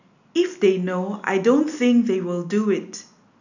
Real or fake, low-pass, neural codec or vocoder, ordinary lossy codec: real; 7.2 kHz; none; none